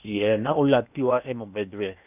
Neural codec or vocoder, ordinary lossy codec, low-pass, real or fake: codec, 16 kHz in and 24 kHz out, 0.8 kbps, FocalCodec, streaming, 65536 codes; none; 3.6 kHz; fake